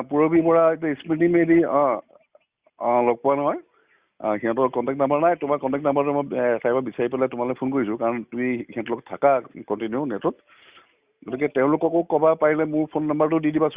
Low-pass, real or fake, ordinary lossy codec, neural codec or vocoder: 3.6 kHz; real; Opus, 64 kbps; none